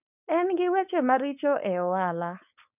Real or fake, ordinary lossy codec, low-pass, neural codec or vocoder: fake; none; 3.6 kHz; codec, 16 kHz, 4.8 kbps, FACodec